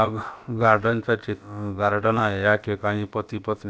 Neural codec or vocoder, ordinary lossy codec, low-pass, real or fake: codec, 16 kHz, about 1 kbps, DyCAST, with the encoder's durations; none; none; fake